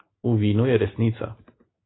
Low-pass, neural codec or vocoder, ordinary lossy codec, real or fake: 7.2 kHz; none; AAC, 16 kbps; real